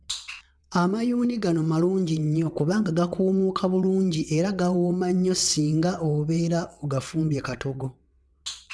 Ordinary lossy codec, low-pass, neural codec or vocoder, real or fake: none; none; vocoder, 22.05 kHz, 80 mel bands, WaveNeXt; fake